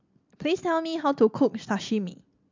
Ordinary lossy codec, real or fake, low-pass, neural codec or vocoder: MP3, 64 kbps; real; 7.2 kHz; none